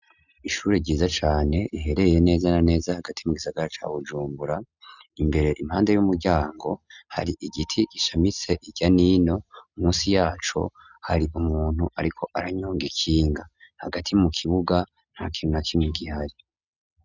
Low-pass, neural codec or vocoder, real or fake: 7.2 kHz; none; real